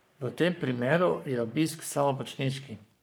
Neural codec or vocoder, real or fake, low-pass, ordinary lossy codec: codec, 44.1 kHz, 3.4 kbps, Pupu-Codec; fake; none; none